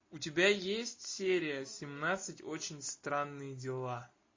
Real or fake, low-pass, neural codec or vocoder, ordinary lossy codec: real; 7.2 kHz; none; MP3, 32 kbps